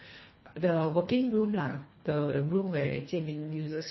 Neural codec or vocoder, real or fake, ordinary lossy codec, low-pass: codec, 24 kHz, 1.5 kbps, HILCodec; fake; MP3, 24 kbps; 7.2 kHz